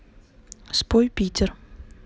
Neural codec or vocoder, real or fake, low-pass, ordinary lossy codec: none; real; none; none